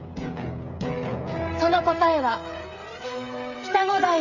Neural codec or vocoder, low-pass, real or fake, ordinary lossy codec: codec, 16 kHz, 16 kbps, FreqCodec, smaller model; 7.2 kHz; fake; none